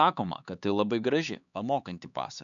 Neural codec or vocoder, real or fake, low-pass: codec, 16 kHz, 4 kbps, X-Codec, HuBERT features, trained on LibriSpeech; fake; 7.2 kHz